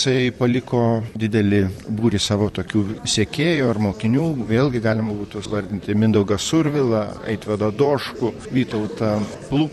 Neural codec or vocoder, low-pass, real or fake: vocoder, 44.1 kHz, 128 mel bands, Pupu-Vocoder; 14.4 kHz; fake